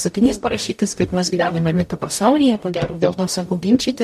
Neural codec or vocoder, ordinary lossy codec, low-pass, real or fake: codec, 44.1 kHz, 0.9 kbps, DAC; MP3, 64 kbps; 14.4 kHz; fake